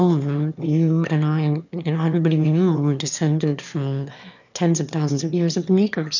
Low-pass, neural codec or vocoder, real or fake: 7.2 kHz; autoencoder, 22.05 kHz, a latent of 192 numbers a frame, VITS, trained on one speaker; fake